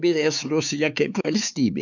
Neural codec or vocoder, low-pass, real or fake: codec, 16 kHz, 4 kbps, X-Codec, HuBERT features, trained on LibriSpeech; 7.2 kHz; fake